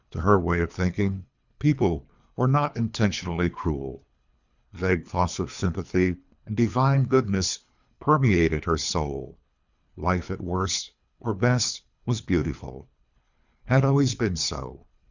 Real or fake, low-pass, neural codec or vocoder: fake; 7.2 kHz; codec, 24 kHz, 3 kbps, HILCodec